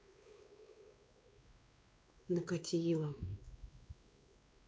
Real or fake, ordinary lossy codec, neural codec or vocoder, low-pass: fake; none; codec, 16 kHz, 2 kbps, X-Codec, WavLM features, trained on Multilingual LibriSpeech; none